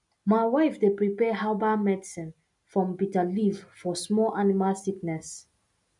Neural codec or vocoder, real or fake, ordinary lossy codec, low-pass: none; real; none; 10.8 kHz